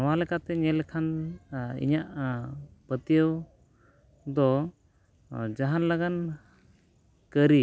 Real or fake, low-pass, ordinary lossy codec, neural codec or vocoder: real; none; none; none